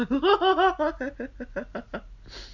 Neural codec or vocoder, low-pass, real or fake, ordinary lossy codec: none; 7.2 kHz; real; none